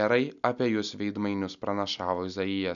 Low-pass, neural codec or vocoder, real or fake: 7.2 kHz; none; real